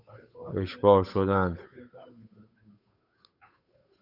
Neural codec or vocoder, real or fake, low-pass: codec, 16 kHz, 8 kbps, FunCodec, trained on Chinese and English, 25 frames a second; fake; 5.4 kHz